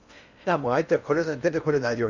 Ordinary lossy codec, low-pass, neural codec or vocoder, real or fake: none; 7.2 kHz; codec, 16 kHz in and 24 kHz out, 0.8 kbps, FocalCodec, streaming, 65536 codes; fake